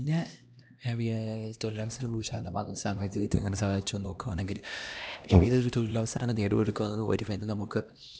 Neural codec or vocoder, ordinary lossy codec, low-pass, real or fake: codec, 16 kHz, 1 kbps, X-Codec, HuBERT features, trained on LibriSpeech; none; none; fake